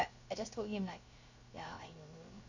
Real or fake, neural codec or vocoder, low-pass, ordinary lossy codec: fake; codec, 16 kHz, 0.7 kbps, FocalCodec; 7.2 kHz; none